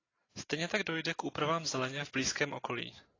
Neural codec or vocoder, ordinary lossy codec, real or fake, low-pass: none; AAC, 32 kbps; real; 7.2 kHz